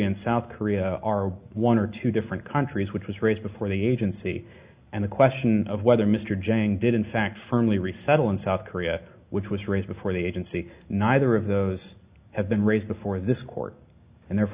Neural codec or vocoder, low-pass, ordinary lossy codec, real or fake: none; 3.6 kHz; Opus, 64 kbps; real